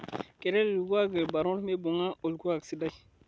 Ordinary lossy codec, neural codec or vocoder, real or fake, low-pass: none; none; real; none